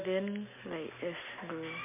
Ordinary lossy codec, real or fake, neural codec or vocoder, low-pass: MP3, 32 kbps; real; none; 3.6 kHz